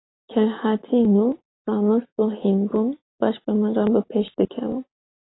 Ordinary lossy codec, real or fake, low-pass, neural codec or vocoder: AAC, 16 kbps; real; 7.2 kHz; none